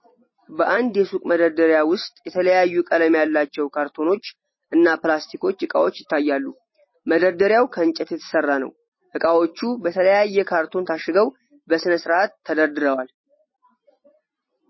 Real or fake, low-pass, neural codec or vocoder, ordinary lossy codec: real; 7.2 kHz; none; MP3, 24 kbps